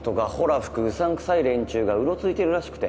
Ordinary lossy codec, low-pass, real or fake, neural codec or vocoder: none; none; real; none